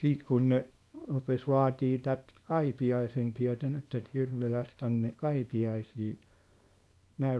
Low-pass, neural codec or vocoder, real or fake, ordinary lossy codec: none; codec, 24 kHz, 0.9 kbps, WavTokenizer, small release; fake; none